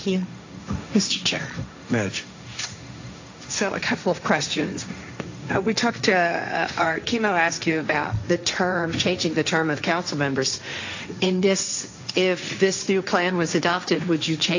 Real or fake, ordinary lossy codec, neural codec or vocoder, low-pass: fake; AAC, 48 kbps; codec, 16 kHz, 1.1 kbps, Voila-Tokenizer; 7.2 kHz